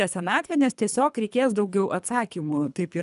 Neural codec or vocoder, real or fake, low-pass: codec, 24 kHz, 3 kbps, HILCodec; fake; 10.8 kHz